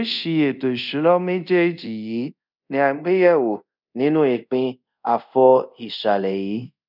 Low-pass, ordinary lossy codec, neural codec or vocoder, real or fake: 5.4 kHz; none; codec, 24 kHz, 0.5 kbps, DualCodec; fake